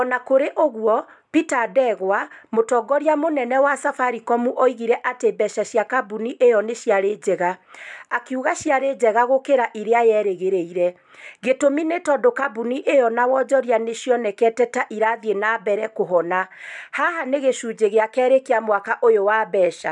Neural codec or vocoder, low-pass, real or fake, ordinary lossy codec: none; 10.8 kHz; real; none